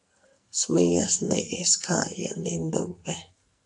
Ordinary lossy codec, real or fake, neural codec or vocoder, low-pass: AAC, 64 kbps; fake; codec, 44.1 kHz, 2.6 kbps, SNAC; 10.8 kHz